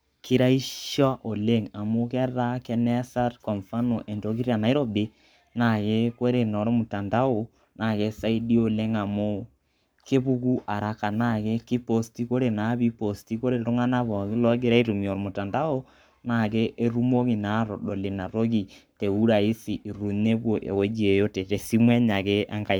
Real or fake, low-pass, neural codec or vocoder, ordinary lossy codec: fake; none; codec, 44.1 kHz, 7.8 kbps, Pupu-Codec; none